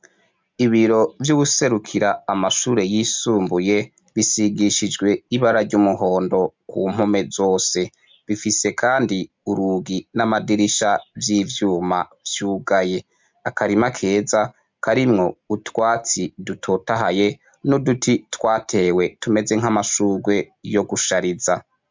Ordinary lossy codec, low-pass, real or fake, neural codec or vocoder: MP3, 64 kbps; 7.2 kHz; real; none